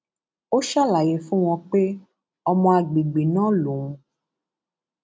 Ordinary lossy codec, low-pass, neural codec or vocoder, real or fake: none; none; none; real